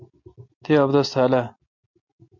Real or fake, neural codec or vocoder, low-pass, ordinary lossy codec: real; none; 7.2 kHz; MP3, 48 kbps